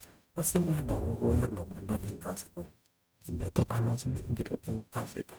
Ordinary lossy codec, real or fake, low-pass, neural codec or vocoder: none; fake; none; codec, 44.1 kHz, 0.9 kbps, DAC